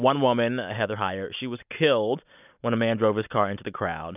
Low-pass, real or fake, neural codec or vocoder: 3.6 kHz; real; none